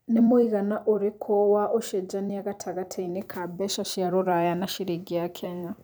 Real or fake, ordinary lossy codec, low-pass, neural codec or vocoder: fake; none; none; vocoder, 44.1 kHz, 128 mel bands every 256 samples, BigVGAN v2